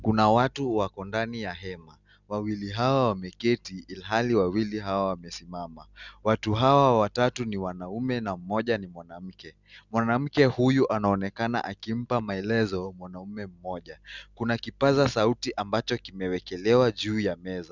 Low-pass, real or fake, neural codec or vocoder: 7.2 kHz; real; none